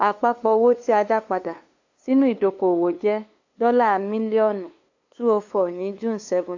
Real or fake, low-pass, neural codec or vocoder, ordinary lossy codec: fake; 7.2 kHz; codec, 16 kHz, 2 kbps, FunCodec, trained on LibriTTS, 25 frames a second; AAC, 48 kbps